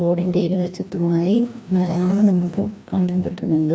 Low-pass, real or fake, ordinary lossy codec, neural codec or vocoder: none; fake; none; codec, 16 kHz, 1 kbps, FreqCodec, larger model